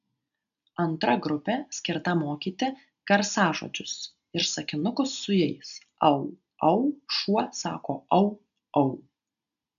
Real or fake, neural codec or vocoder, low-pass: real; none; 7.2 kHz